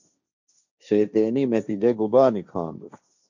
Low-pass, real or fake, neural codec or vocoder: 7.2 kHz; fake; codec, 16 kHz, 1.1 kbps, Voila-Tokenizer